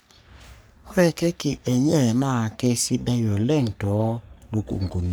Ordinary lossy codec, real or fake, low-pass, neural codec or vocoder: none; fake; none; codec, 44.1 kHz, 3.4 kbps, Pupu-Codec